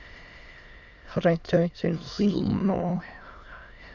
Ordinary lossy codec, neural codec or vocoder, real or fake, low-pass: Opus, 64 kbps; autoencoder, 22.05 kHz, a latent of 192 numbers a frame, VITS, trained on many speakers; fake; 7.2 kHz